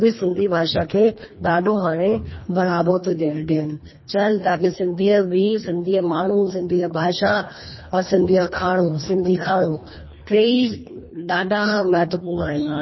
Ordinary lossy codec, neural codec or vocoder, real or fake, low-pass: MP3, 24 kbps; codec, 24 kHz, 1.5 kbps, HILCodec; fake; 7.2 kHz